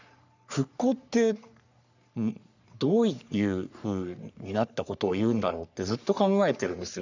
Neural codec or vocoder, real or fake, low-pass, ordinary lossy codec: codec, 44.1 kHz, 3.4 kbps, Pupu-Codec; fake; 7.2 kHz; none